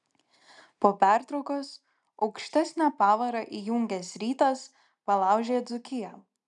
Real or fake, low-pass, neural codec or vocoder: real; 10.8 kHz; none